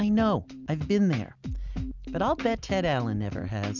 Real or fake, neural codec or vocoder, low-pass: real; none; 7.2 kHz